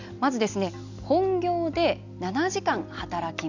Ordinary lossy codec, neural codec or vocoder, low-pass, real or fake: none; none; 7.2 kHz; real